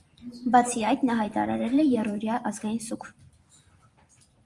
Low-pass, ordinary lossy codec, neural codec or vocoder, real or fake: 10.8 kHz; Opus, 32 kbps; vocoder, 44.1 kHz, 128 mel bands every 512 samples, BigVGAN v2; fake